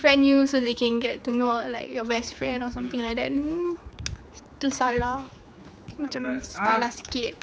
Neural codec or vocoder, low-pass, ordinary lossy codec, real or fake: codec, 16 kHz, 4 kbps, X-Codec, HuBERT features, trained on general audio; none; none; fake